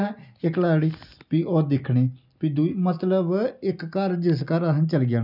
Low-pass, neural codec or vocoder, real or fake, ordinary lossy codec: 5.4 kHz; none; real; none